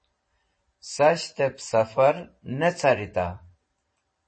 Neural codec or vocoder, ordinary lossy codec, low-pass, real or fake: vocoder, 44.1 kHz, 128 mel bands every 512 samples, BigVGAN v2; MP3, 32 kbps; 9.9 kHz; fake